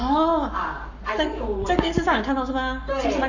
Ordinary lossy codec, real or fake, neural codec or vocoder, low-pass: none; fake; codec, 44.1 kHz, 7.8 kbps, Pupu-Codec; 7.2 kHz